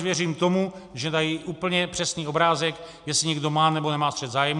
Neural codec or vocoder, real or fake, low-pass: none; real; 10.8 kHz